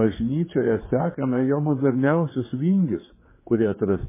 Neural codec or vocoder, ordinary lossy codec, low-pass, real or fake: codec, 16 kHz, 4 kbps, X-Codec, HuBERT features, trained on general audio; MP3, 16 kbps; 3.6 kHz; fake